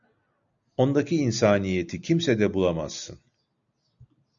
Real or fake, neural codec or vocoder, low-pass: real; none; 7.2 kHz